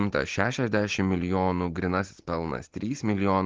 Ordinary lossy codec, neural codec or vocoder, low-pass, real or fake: Opus, 16 kbps; none; 7.2 kHz; real